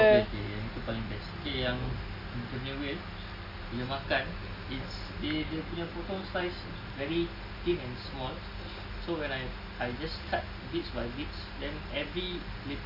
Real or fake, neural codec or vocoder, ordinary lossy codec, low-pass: real; none; none; 5.4 kHz